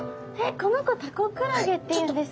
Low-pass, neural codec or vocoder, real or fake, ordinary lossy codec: none; none; real; none